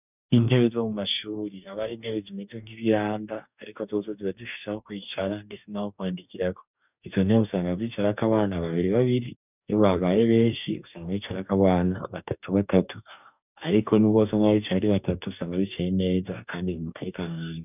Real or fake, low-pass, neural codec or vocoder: fake; 3.6 kHz; codec, 44.1 kHz, 2.6 kbps, DAC